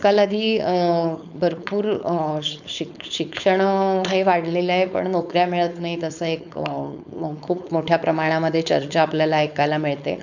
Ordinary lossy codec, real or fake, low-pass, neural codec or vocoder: none; fake; 7.2 kHz; codec, 16 kHz, 4.8 kbps, FACodec